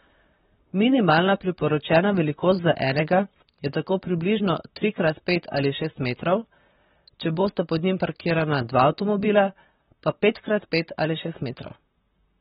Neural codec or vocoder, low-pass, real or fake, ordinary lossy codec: vocoder, 44.1 kHz, 128 mel bands, Pupu-Vocoder; 19.8 kHz; fake; AAC, 16 kbps